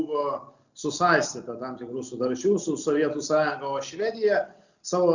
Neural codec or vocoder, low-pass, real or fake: none; 7.2 kHz; real